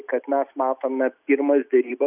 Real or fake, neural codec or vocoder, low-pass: real; none; 3.6 kHz